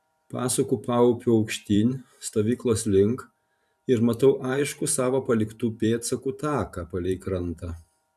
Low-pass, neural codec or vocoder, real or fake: 14.4 kHz; vocoder, 44.1 kHz, 128 mel bands every 512 samples, BigVGAN v2; fake